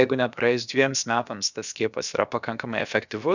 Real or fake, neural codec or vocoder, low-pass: fake; codec, 16 kHz, about 1 kbps, DyCAST, with the encoder's durations; 7.2 kHz